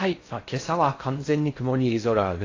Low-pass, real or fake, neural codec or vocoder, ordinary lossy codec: 7.2 kHz; fake; codec, 16 kHz in and 24 kHz out, 0.6 kbps, FocalCodec, streaming, 4096 codes; AAC, 32 kbps